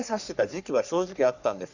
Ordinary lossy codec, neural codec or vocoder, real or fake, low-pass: none; codec, 44.1 kHz, 3.4 kbps, Pupu-Codec; fake; 7.2 kHz